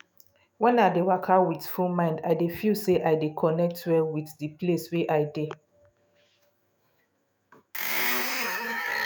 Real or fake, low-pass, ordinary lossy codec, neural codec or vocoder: fake; none; none; autoencoder, 48 kHz, 128 numbers a frame, DAC-VAE, trained on Japanese speech